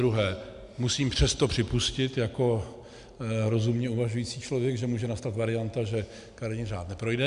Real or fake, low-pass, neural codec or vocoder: real; 10.8 kHz; none